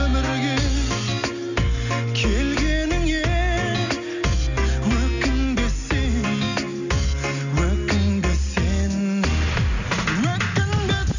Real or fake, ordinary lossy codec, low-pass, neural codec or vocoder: real; none; 7.2 kHz; none